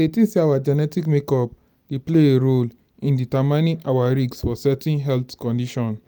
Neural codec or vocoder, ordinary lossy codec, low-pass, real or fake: none; none; none; real